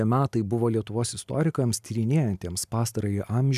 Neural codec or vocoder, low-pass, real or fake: none; 14.4 kHz; real